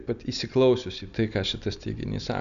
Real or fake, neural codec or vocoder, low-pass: real; none; 7.2 kHz